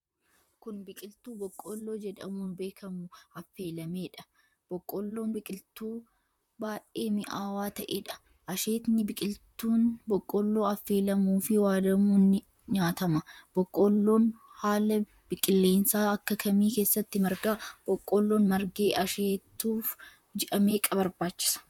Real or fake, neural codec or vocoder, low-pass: fake; vocoder, 44.1 kHz, 128 mel bands, Pupu-Vocoder; 19.8 kHz